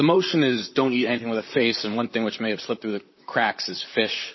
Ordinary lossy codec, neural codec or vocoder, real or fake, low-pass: MP3, 24 kbps; vocoder, 44.1 kHz, 128 mel bands, Pupu-Vocoder; fake; 7.2 kHz